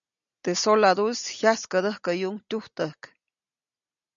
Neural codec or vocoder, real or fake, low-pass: none; real; 7.2 kHz